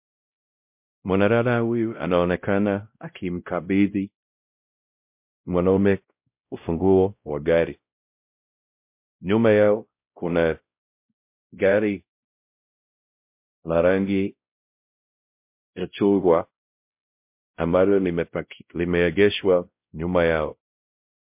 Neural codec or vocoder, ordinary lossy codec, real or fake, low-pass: codec, 16 kHz, 0.5 kbps, X-Codec, WavLM features, trained on Multilingual LibriSpeech; MP3, 32 kbps; fake; 3.6 kHz